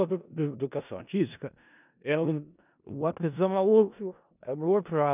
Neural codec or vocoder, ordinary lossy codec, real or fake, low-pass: codec, 16 kHz in and 24 kHz out, 0.4 kbps, LongCat-Audio-Codec, four codebook decoder; none; fake; 3.6 kHz